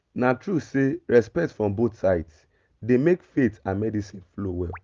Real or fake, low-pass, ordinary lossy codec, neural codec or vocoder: real; 7.2 kHz; Opus, 32 kbps; none